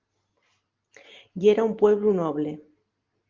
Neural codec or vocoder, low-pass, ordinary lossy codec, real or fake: none; 7.2 kHz; Opus, 32 kbps; real